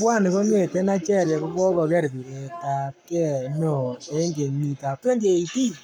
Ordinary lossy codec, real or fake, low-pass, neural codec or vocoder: none; fake; 19.8 kHz; codec, 44.1 kHz, 7.8 kbps, Pupu-Codec